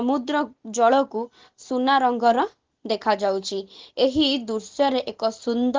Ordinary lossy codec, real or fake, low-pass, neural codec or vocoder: Opus, 16 kbps; real; 7.2 kHz; none